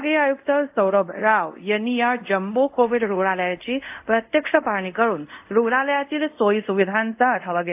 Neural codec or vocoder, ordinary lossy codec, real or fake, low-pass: codec, 24 kHz, 0.5 kbps, DualCodec; AAC, 32 kbps; fake; 3.6 kHz